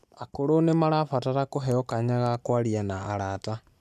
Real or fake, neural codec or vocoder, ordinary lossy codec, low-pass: real; none; AAC, 96 kbps; 14.4 kHz